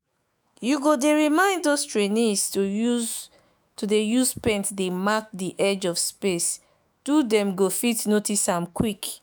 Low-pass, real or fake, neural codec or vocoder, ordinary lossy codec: none; fake; autoencoder, 48 kHz, 128 numbers a frame, DAC-VAE, trained on Japanese speech; none